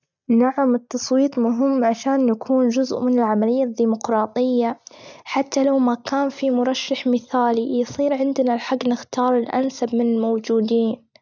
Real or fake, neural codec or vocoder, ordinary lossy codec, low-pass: real; none; none; 7.2 kHz